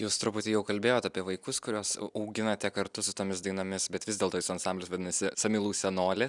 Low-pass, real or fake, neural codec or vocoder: 10.8 kHz; real; none